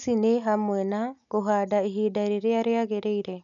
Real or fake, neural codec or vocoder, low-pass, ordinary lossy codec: real; none; 7.2 kHz; none